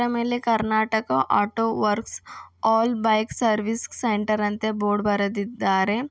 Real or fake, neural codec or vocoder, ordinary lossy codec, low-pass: real; none; none; none